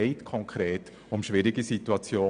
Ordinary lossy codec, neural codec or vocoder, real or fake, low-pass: none; none; real; 9.9 kHz